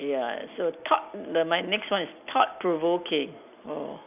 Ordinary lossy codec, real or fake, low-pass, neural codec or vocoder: none; real; 3.6 kHz; none